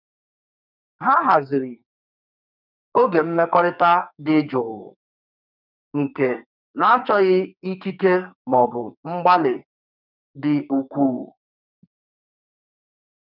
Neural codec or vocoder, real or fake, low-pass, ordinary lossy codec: codec, 44.1 kHz, 2.6 kbps, SNAC; fake; 5.4 kHz; none